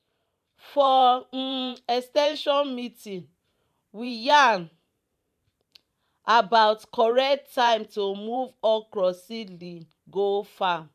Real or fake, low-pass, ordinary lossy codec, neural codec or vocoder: fake; 14.4 kHz; none; vocoder, 44.1 kHz, 128 mel bands every 512 samples, BigVGAN v2